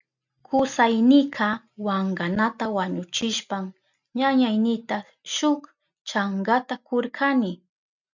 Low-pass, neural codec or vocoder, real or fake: 7.2 kHz; none; real